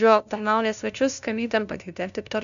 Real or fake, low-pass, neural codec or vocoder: fake; 7.2 kHz; codec, 16 kHz, 0.5 kbps, FunCodec, trained on LibriTTS, 25 frames a second